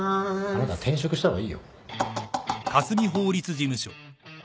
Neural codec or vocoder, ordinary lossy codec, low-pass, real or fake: none; none; none; real